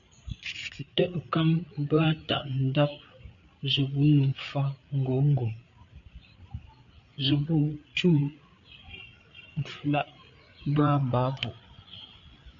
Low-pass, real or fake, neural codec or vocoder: 7.2 kHz; fake; codec, 16 kHz, 8 kbps, FreqCodec, larger model